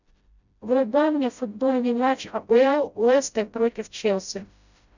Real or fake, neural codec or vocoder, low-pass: fake; codec, 16 kHz, 0.5 kbps, FreqCodec, smaller model; 7.2 kHz